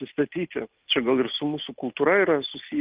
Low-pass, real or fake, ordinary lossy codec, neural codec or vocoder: 3.6 kHz; real; Opus, 32 kbps; none